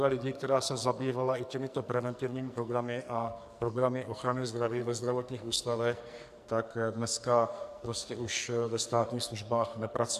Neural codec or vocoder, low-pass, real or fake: codec, 44.1 kHz, 2.6 kbps, SNAC; 14.4 kHz; fake